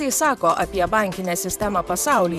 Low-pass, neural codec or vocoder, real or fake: 14.4 kHz; vocoder, 44.1 kHz, 128 mel bands, Pupu-Vocoder; fake